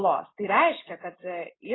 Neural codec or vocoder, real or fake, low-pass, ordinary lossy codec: none; real; 7.2 kHz; AAC, 16 kbps